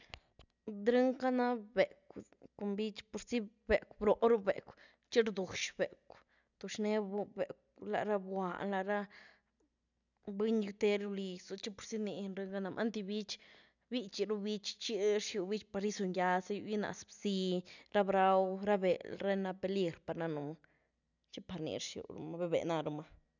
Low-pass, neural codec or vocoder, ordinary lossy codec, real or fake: 7.2 kHz; none; none; real